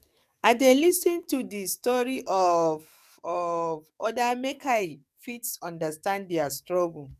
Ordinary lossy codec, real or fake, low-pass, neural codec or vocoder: none; fake; 14.4 kHz; codec, 44.1 kHz, 7.8 kbps, DAC